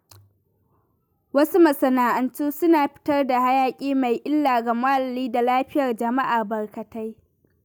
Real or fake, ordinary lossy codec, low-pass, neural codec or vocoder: real; none; none; none